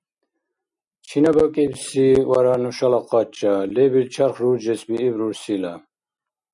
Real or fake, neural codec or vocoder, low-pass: real; none; 10.8 kHz